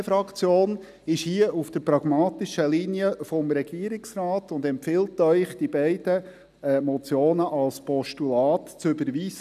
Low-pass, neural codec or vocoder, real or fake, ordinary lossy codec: 14.4 kHz; none; real; none